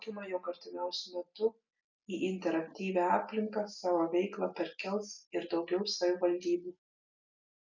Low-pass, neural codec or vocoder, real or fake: 7.2 kHz; none; real